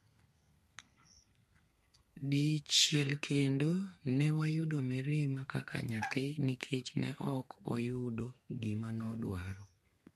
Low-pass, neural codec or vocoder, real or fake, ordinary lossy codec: 14.4 kHz; codec, 32 kHz, 1.9 kbps, SNAC; fake; MP3, 64 kbps